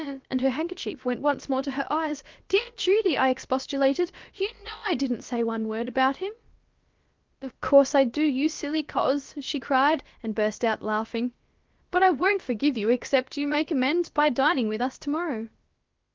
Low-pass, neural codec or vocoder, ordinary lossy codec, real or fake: 7.2 kHz; codec, 16 kHz, about 1 kbps, DyCAST, with the encoder's durations; Opus, 32 kbps; fake